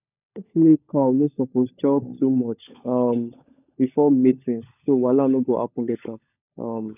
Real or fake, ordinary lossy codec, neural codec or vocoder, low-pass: fake; none; codec, 16 kHz, 16 kbps, FunCodec, trained on LibriTTS, 50 frames a second; 3.6 kHz